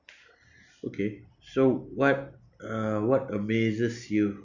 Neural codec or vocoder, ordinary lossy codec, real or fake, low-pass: none; none; real; 7.2 kHz